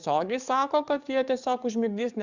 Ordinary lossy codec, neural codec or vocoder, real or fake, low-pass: Opus, 64 kbps; codec, 44.1 kHz, 7.8 kbps, DAC; fake; 7.2 kHz